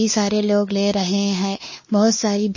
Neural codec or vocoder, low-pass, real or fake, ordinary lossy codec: codec, 16 kHz, 2 kbps, X-Codec, WavLM features, trained on Multilingual LibriSpeech; 7.2 kHz; fake; MP3, 32 kbps